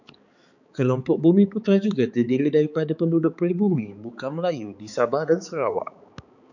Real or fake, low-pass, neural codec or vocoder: fake; 7.2 kHz; codec, 16 kHz, 4 kbps, X-Codec, HuBERT features, trained on balanced general audio